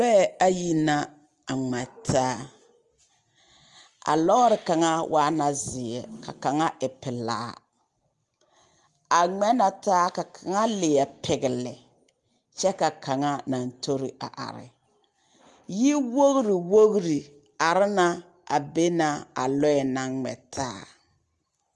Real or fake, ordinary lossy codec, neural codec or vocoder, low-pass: real; Opus, 32 kbps; none; 10.8 kHz